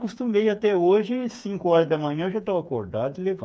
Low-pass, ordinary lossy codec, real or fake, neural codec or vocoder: none; none; fake; codec, 16 kHz, 4 kbps, FreqCodec, smaller model